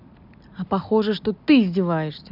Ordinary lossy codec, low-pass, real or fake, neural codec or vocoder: none; 5.4 kHz; real; none